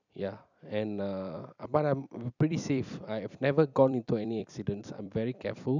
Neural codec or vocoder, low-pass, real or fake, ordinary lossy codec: vocoder, 44.1 kHz, 128 mel bands every 256 samples, BigVGAN v2; 7.2 kHz; fake; none